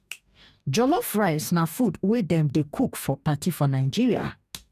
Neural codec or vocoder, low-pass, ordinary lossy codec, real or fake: codec, 44.1 kHz, 2.6 kbps, DAC; 14.4 kHz; none; fake